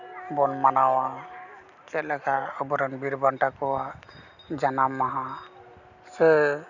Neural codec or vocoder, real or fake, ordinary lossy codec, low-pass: none; real; none; 7.2 kHz